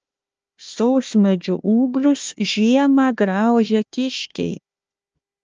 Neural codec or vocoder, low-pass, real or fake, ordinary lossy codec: codec, 16 kHz, 1 kbps, FunCodec, trained on Chinese and English, 50 frames a second; 7.2 kHz; fake; Opus, 24 kbps